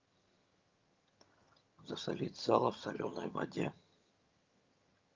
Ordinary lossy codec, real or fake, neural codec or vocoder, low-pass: Opus, 32 kbps; fake; vocoder, 22.05 kHz, 80 mel bands, HiFi-GAN; 7.2 kHz